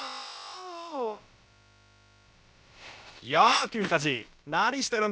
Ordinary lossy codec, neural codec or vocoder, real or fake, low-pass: none; codec, 16 kHz, about 1 kbps, DyCAST, with the encoder's durations; fake; none